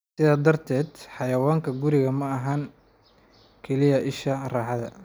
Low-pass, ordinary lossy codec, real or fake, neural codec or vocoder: none; none; real; none